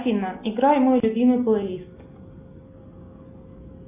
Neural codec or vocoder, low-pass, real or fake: none; 3.6 kHz; real